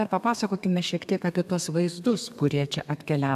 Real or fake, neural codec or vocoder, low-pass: fake; codec, 32 kHz, 1.9 kbps, SNAC; 14.4 kHz